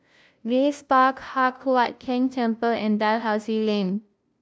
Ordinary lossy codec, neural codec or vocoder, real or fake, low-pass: none; codec, 16 kHz, 0.5 kbps, FunCodec, trained on LibriTTS, 25 frames a second; fake; none